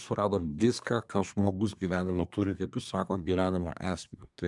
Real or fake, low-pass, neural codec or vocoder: fake; 10.8 kHz; codec, 24 kHz, 1 kbps, SNAC